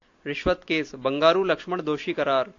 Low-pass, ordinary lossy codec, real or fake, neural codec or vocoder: 7.2 kHz; AAC, 48 kbps; real; none